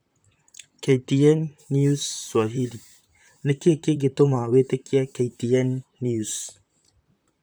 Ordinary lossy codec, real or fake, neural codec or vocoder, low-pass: none; fake; vocoder, 44.1 kHz, 128 mel bands, Pupu-Vocoder; none